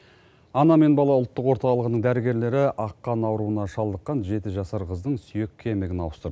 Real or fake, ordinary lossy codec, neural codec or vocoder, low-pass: real; none; none; none